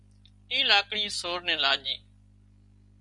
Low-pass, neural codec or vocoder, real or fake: 10.8 kHz; none; real